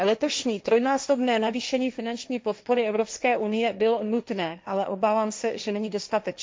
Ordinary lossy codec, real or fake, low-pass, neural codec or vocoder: none; fake; none; codec, 16 kHz, 1.1 kbps, Voila-Tokenizer